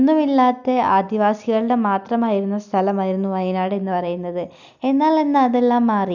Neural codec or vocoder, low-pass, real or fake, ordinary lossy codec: none; 7.2 kHz; real; none